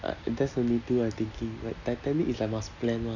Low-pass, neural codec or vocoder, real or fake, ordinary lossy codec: 7.2 kHz; none; real; none